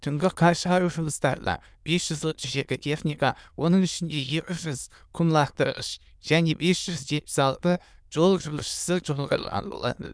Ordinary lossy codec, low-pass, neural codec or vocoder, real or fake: none; none; autoencoder, 22.05 kHz, a latent of 192 numbers a frame, VITS, trained on many speakers; fake